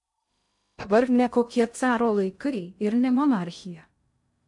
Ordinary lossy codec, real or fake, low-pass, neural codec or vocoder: MP3, 64 kbps; fake; 10.8 kHz; codec, 16 kHz in and 24 kHz out, 0.6 kbps, FocalCodec, streaming, 2048 codes